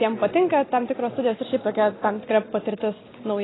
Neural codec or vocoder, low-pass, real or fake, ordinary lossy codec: none; 7.2 kHz; real; AAC, 16 kbps